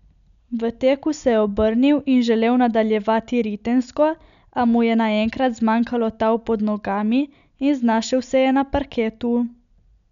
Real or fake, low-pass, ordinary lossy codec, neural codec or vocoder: real; 7.2 kHz; none; none